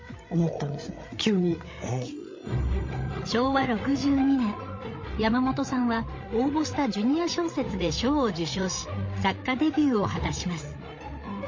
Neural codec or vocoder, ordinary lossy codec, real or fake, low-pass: codec, 16 kHz, 8 kbps, FreqCodec, larger model; MP3, 32 kbps; fake; 7.2 kHz